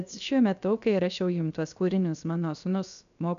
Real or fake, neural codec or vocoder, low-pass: fake; codec, 16 kHz, 0.7 kbps, FocalCodec; 7.2 kHz